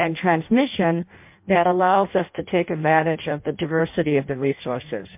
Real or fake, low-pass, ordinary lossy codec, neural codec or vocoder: fake; 3.6 kHz; MP3, 32 kbps; codec, 16 kHz in and 24 kHz out, 0.6 kbps, FireRedTTS-2 codec